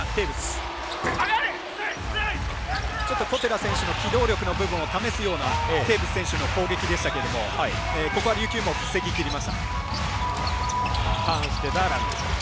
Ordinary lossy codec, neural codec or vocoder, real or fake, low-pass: none; none; real; none